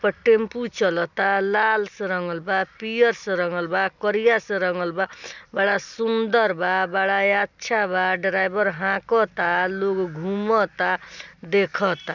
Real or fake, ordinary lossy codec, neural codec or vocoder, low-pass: real; none; none; 7.2 kHz